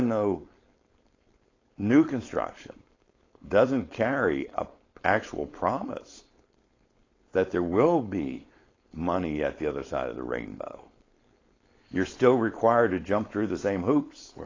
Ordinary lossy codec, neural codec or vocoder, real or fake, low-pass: AAC, 32 kbps; codec, 16 kHz, 4.8 kbps, FACodec; fake; 7.2 kHz